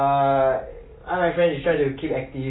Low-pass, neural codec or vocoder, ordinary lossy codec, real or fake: 7.2 kHz; none; AAC, 16 kbps; real